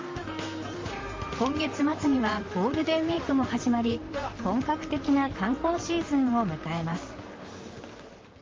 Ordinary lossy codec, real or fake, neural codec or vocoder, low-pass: Opus, 32 kbps; fake; vocoder, 44.1 kHz, 128 mel bands, Pupu-Vocoder; 7.2 kHz